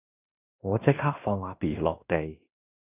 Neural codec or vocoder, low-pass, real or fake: codec, 16 kHz in and 24 kHz out, 0.9 kbps, LongCat-Audio-Codec, fine tuned four codebook decoder; 3.6 kHz; fake